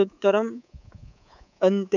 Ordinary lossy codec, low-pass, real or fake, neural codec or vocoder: none; 7.2 kHz; fake; codec, 24 kHz, 3.1 kbps, DualCodec